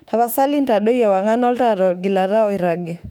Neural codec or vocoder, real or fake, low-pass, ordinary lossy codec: autoencoder, 48 kHz, 32 numbers a frame, DAC-VAE, trained on Japanese speech; fake; 19.8 kHz; none